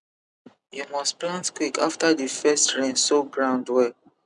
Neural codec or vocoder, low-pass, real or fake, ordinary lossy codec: none; 10.8 kHz; real; none